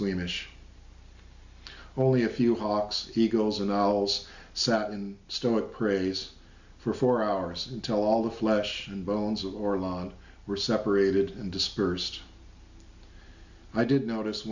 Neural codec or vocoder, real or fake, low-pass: none; real; 7.2 kHz